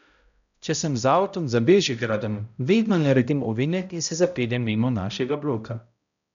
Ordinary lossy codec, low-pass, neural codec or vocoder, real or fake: none; 7.2 kHz; codec, 16 kHz, 0.5 kbps, X-Codec, HuBERT features, trained on balanced general audio; fake